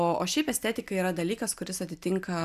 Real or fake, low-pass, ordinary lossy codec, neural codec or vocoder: real; 14.4 kHz; AAC, 96 kbps; none